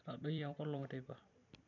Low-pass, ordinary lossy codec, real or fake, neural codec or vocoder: 7.2 kHz; none; fake; vocoder, 44.1 kHz, 128 mel bands every 256 samples, BigVGAN v2